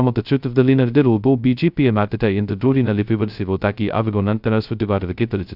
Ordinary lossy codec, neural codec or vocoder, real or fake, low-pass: none; codec, 16 kHz, 0.2 kbps, FocalCodec; fake; 5.4 kHz